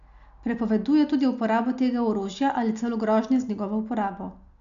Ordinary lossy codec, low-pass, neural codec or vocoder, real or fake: none; 7.2 kHz; none; real